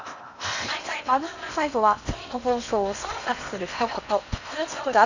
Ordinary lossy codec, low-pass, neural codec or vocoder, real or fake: none; 7.2 kHz; codec, 16 kHz in and 24 kHz out, 0.8 kbps, FocalCodec, streaming, 65536 codes; fake